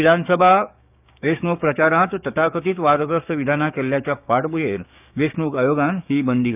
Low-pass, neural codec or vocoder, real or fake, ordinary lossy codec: 3.6 kHz; codec, 16 kHz, 6 kbps, DAC; fake; none